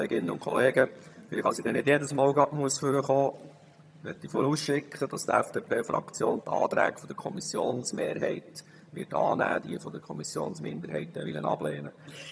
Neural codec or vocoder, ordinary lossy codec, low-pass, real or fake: vocoder, 22.05 kHz, 80 mel bands, HiFi-GAN; none; none; fake